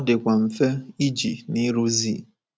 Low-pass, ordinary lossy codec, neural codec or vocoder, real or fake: none; none; none; real